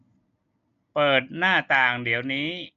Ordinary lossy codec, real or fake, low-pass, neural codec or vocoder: none; real; 7.2 kHz; none